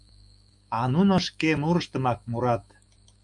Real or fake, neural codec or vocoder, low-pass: fake; autoencoder, 48 kHz, 128 numbers a frame, DAC-VAE, trained on Japanese speech; 10.8 kHz